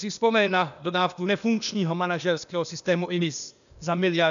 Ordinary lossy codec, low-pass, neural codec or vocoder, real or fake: MP3, 96 kbps; 7.2 kHz; codec, 16 kHz, 0.8 kbps, ZipCodec; fake